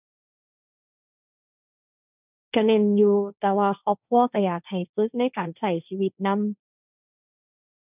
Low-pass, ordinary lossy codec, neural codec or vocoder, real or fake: 3.6 kHz; none; codec, 16 kHz, 1.1 kbps, Voila-Tokenizer; fake